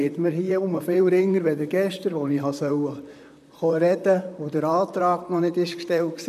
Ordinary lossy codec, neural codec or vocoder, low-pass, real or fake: none; vocoder, 44.1 kHz, 128 mel bands, Pupu-Vocoder; 14.4 kHz; fake